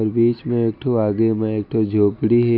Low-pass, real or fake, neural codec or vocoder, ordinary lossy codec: 5.4 kHz; real; none; none